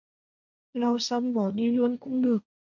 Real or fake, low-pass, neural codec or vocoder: fake; 7.2 kHz; codec, 16 kHz, 1.1 kbps, Voila-Tokenizer